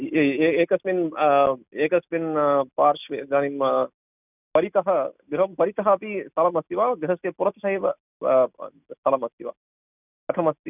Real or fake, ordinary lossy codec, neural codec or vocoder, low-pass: real; none; none; 3.6 kHz